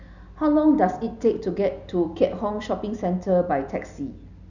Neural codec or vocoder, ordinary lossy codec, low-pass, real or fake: none; none; 7.2 kHz; real